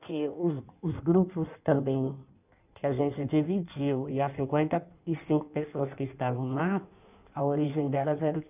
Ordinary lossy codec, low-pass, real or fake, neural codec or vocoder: none; 3.6 kHz; fake; codec, 16 kHz in and 24 kHz out, 1.1 kbps, FireRedTTS-2 codec